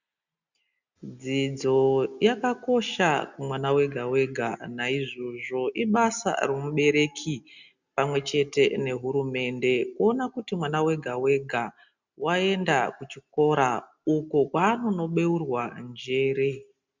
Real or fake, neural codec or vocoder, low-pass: real; none; 7.2 kHz